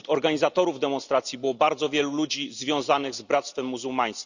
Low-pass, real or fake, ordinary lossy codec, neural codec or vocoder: 7.2 kHz; real; none; none